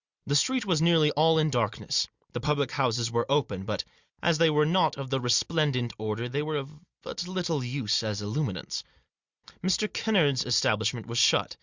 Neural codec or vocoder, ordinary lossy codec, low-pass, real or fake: none; Opus, 64 kbps; 7.2 kHz; real